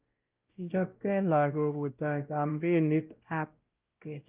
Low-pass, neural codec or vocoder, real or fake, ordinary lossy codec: 3.6 kHz; codec, 16 kHz, 0.5 kbps, X-Codec, WavLM features, trained on Multilingual LibriSpeech; fake; Opus, 24 kbps